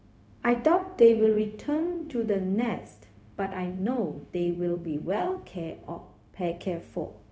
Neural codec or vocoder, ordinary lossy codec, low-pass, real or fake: codec, 16 kHz, 0.4 kbps, LongCat-Audio-Codec; none; none; fake